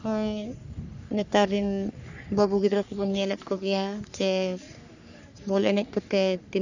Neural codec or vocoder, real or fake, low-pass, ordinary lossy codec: codec, 44.1 kHz, 3.4 kbps, Pupu-Codec; fake; 7.2 kHz; none